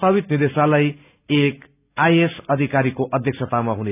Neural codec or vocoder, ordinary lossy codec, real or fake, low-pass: none; none; real; 3.6 kHz